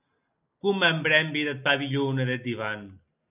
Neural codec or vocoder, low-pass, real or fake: none; 3.6 kHz; real